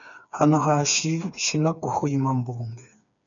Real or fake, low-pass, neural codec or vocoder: fake; 7.2 kHz; codec, 16 kHz, 4 kbps, FreqCodec, smaller model